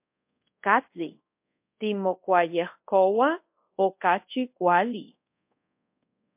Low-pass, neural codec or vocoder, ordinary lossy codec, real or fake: 3.6 kHz; codec, 24 kHz, 0.5 kbps, DualCodec; MP3, 32 kbps; fake